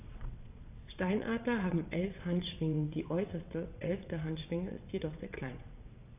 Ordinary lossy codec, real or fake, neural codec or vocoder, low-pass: AAC, 32 kbps; fake; vocoder, 22.05 kHz, 80 mel bands, WaveNeXt; 3.6 kHz